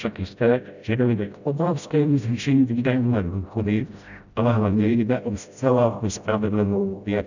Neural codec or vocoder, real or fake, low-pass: codec, 16 kHz, 0.5 kbps, FreqCodec, smaller model; fake; 7.2 kHz